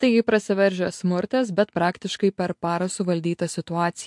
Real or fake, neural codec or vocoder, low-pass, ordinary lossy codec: real; none; 10.8 kHz; MP3, 64 kbps